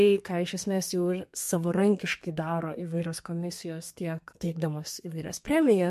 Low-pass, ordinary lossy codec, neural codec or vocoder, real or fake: 14.4 kHz; MP3, 64 kbps; codec, 32 kHz, 1.9 kbps, SNAC; fake